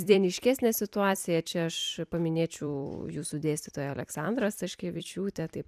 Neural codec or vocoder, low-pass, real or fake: vocoder, 44.1 kHz, 128 mel bands every 256 samples, BigVGAN v2; 14.4 kHz; fake